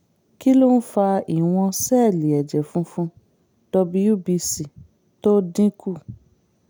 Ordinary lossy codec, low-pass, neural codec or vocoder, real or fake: none; none; none; real